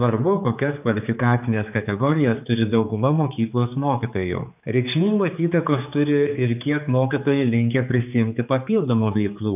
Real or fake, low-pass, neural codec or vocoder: fake; 3.6 kHz; codec, 16 kHz, 4 kbps, X-Codec, HuBERT features, trained on general audio